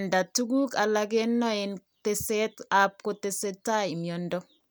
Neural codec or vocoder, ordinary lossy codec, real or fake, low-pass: none; none; real; none